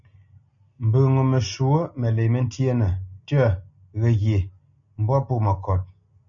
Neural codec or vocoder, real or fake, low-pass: none; real; 7.2 kHz